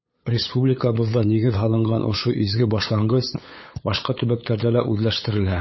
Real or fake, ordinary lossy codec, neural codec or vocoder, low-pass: fake; MP3, 24 kbps; codec, 16 kHz, 8 kbps, FunCodec, trained on LibriTTS, 25 frames a second; 7.2 kHz